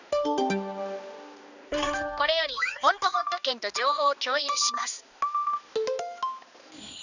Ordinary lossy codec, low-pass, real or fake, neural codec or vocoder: none; 7.2 kHz; fake; codec, 16 kHz, 2 kbps, X-Codec, HuBERT features, trained on balanced general audio